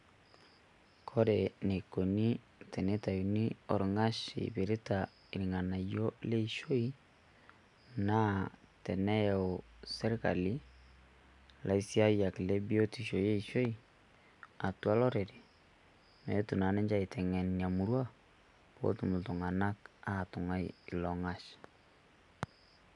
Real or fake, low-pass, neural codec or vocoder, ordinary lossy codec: real; 10.8 kHz; none; none